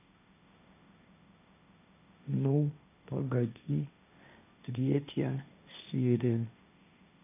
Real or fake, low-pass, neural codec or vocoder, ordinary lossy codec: fake; 3.6 kHz; codec, 16 kHz, 1.1 kbps, Voila-Tokenizer; none